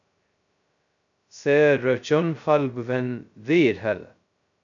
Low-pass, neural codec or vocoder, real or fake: 7.2 kHz; codec, 16 kHz, 0.2 kbps, FocalCodec; fake